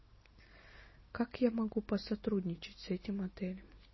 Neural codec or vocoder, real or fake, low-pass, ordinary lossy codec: none; real; 7.2 kHz; MP3, 24 kbps